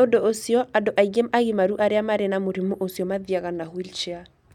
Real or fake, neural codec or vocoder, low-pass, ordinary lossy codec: real; none; 19.8 kHz; none